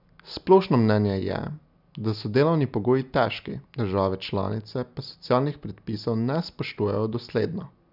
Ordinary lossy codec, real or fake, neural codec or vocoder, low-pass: none; real; none; 5.4 kHz